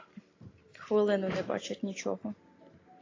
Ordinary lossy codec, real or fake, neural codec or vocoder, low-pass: AAC, 32 kbps; real; none; 7.2 kHz